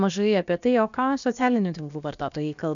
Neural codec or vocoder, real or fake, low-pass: codec, 16 kHz, about 1 kbps, DyCAST, with the encoder's durations; fake; 7.2 kHz